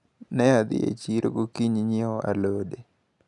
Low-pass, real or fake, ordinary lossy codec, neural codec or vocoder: 10.8 kHz; real; none; none